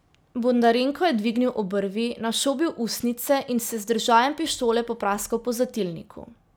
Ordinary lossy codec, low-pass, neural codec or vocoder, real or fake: none; none; none; real